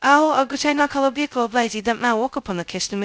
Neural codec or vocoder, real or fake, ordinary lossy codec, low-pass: codec, 16 kHz, 0.2 kbps, FocalCodec; fake; none; none